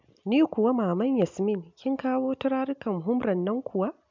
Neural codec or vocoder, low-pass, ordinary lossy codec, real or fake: none; 7.2 kHz; MP3, 64 kbps; real